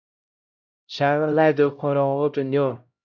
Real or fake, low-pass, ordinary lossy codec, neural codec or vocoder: fake; 7.2 kHz; MP3, 64 kbps; codec, 16 kHz, 0.5 kbps, X-Codec, HuBERT features, trained on LibriSpeech